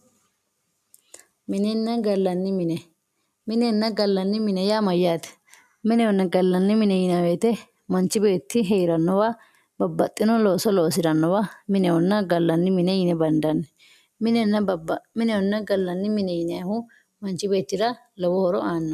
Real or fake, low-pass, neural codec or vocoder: real; 14.4 kHz; none